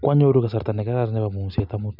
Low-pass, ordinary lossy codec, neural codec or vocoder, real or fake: 5.4 kHz; none; none; real